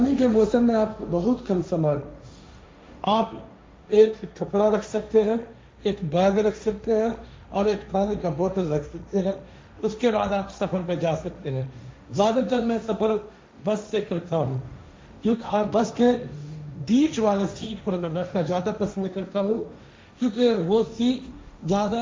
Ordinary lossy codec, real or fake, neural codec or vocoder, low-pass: none; fake; codec, 16 kHz, 1.1 kbps, Voila-Tokenizer; none